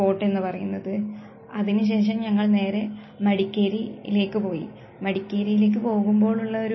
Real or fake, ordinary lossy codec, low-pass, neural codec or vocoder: real; MP3, 24 kbps; 7.2 kHz; none